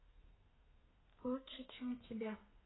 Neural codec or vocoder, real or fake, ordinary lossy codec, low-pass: codec, 32 kHz, 1.9 kbps, SNAC; fake; AAC, 16 kbps; 7.2 kHz